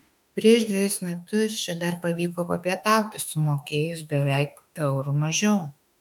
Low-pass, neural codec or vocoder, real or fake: 19.8 kHz; autoencoder, 48 kHz, 32 numbers a frame, DAC-VAE, trained on Japanese speech; fake